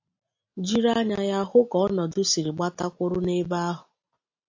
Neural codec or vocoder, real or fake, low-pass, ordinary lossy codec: none; real; 7.2 kHz; AAC, 48 kbps